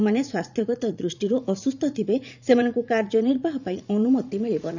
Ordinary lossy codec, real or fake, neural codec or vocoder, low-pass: none; fake; vocoder, 44.1 kHz, 80 mel bands, Vocos; 7.2 kHz